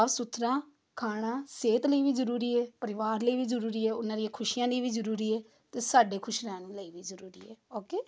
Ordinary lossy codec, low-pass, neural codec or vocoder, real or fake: none; none; none; real